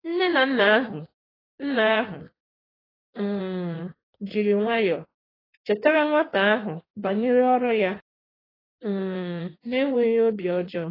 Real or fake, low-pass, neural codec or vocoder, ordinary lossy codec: fake; 5.4 kHz; codec, 16 kHz in and 24 kHz out, 1.1 kbps, FireRedTTS-2 codec; AAC, 24 kbps